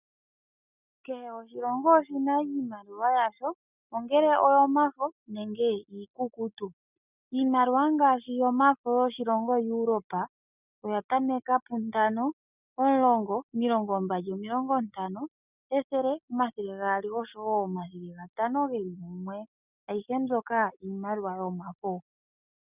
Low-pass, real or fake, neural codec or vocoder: 3.6 kHz; real; none